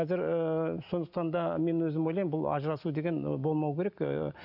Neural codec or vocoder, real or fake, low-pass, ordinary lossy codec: none; real; 5.4 kHz; none